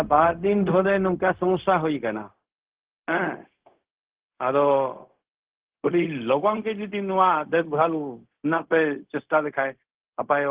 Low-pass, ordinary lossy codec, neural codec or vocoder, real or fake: 3.6 kHz; Opus, 16 kbps; codec, 16 kHz, 0.4 kbps, LongCat-Audio-Codec; fake